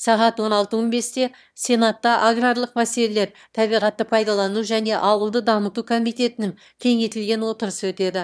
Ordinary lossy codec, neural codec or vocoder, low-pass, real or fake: none; autoencoder, 22.05 kHz, a latent of 192 numbers a frame, VITS, trained on one speaker; none; fake